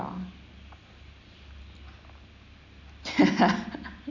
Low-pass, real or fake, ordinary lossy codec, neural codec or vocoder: 7.2 kHz; real; none; none